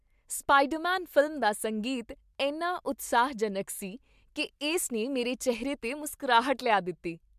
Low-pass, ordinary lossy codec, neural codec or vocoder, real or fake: 14.4 kHz; MP3, 96 kbps; none; real